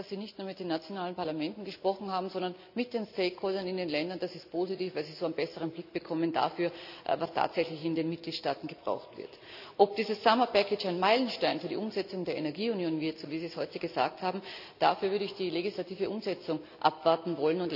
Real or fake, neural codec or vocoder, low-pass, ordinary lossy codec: real; none; 5.4 kHz; none